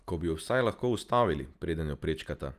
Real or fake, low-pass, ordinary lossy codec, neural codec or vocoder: real; 14.4 kHz; Opus, 32 kbps; none